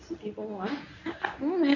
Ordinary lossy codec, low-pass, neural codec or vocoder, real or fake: none; 7.2 kHz; codec, 24 kHz, 0.9 kbps, WavTokenizer, medium speech release version 1; fake